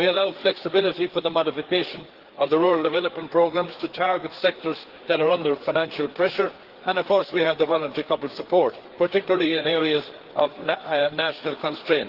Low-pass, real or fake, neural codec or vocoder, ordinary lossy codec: 5.4 kHz; fake; codec, 16 kHz, 4 kbps, FreqCodec, larger model; Opus, 16 kbps